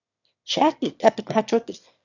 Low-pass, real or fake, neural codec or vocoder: 7.2 kHz; fake; autoencoder, 22.05 kHz, a latent of 192 numbers a frame, VITS, trained on one speaker